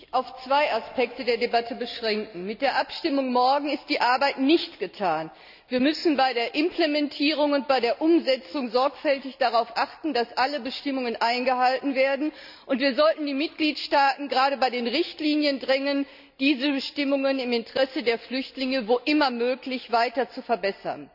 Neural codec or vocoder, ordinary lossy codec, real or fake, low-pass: none; none; real; 5.4 kHz